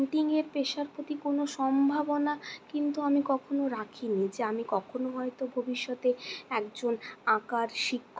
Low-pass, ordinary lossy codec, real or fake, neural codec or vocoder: none; none; real; none